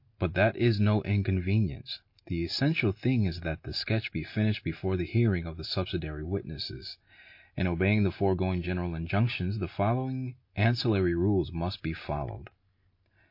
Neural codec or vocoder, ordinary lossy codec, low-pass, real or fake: none; MP3, 32 kbps; 5.4 kHz; real